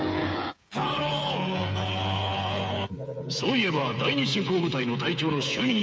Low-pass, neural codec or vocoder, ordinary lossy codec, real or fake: none; codec, 16 kHz, 8 kbps, FreqCodec, smaller model; none; fake